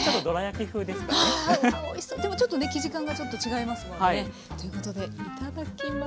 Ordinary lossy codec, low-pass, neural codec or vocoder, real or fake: none; none; none; real